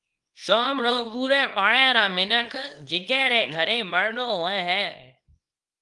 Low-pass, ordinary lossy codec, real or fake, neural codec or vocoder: 10.8 kHz; Opus, 32 kbps; fake; codec, 24 kHz, 0.9 kbps, WavTokenizer, small release